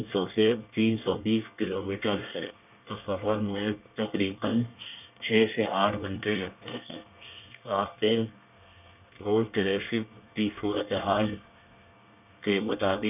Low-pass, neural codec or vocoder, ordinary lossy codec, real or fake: 3.6 kHz; codec, 24 kHz, 1 kbps, SNAC; none; fake